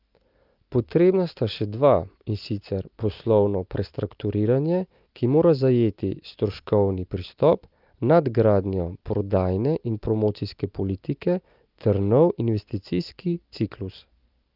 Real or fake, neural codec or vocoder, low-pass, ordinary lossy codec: real; none; 5.4 kHz; Opus, 24 kbps